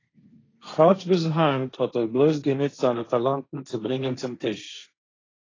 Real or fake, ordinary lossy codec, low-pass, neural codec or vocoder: fake; AAC, 32 kbps; 7.2 kHz; codec, 16 kHz, 1.1 kbps, Voila-Tokenizer